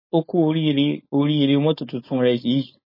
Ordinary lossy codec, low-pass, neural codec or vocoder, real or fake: MP3, 24 kbps; 5.4 kHz; codec, 16 kHz, 4.8 kbps, FACodec; fake